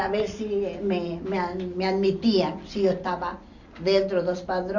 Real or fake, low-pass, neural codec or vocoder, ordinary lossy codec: real; 7.2 kHz; none; none